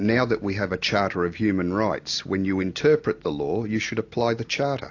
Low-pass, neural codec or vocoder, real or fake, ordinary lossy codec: 7.2 kHz; none; real; AAC, 48 kbps